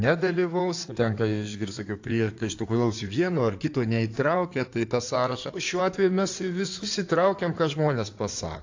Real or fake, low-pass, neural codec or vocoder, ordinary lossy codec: fake; 7.2 kHz; codec, 16 kHz in and 24 kHz out, 2.2 kbps, FireRedTTS-2 codec; AAC, 48 kbps